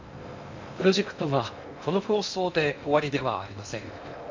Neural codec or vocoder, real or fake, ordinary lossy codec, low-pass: codec, 16 kHz in and 24 kHz out, 0.6 kbps, FocalCodec, streaming, 2048 codes; fake; MP3, 64 kbps; 7.2 kHz